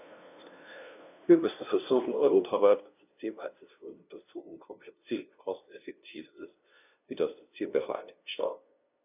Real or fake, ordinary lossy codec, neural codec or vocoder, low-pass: fake; none; codec, 16 kHz, 0.5 kbps, FunCodec, trained on LibriTTS, 25 frames a second; 3.6 kHz